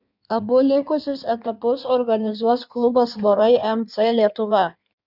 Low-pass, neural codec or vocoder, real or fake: 5.4 kHz; codec, 16 kHz in and 24 kHz out, 1.1 kbps, FireRedTTS-2 codec; fake